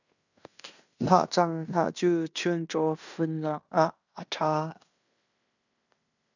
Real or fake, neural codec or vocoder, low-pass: fake; codec, 16 kHz in and 24 kHz out, 0.9 kbps, LongCat-Audio-Codec, fine tuned four codebook decoder; 7.2 kHz